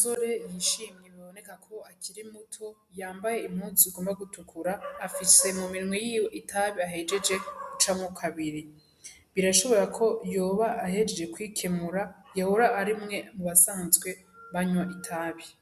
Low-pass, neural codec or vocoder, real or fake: 14.4 kHz; none; real